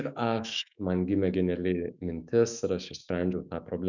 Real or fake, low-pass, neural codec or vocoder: fake; 7.2 kHz; autoencoder, 48 kHz, 128 numbers a frame, DAC-VAE, trained on Japanese speech